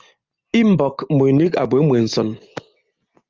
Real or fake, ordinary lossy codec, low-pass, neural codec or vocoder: real; Opus, 32 kbps; 7.2 kHz; none